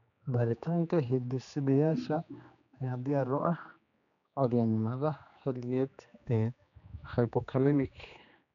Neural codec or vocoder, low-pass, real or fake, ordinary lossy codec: codec, 16 kHz, 2 kbps, X-Codec, HuBERT features, trained on general audio; 7.2 kHz; fake; none